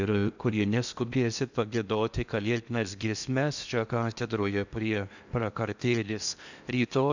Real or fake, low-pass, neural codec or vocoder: fake; 7.2 kHz; codec, 16 kHz in and 24 kHz out, 0.8 kbps, FocalCodec, streaming, 65536 codes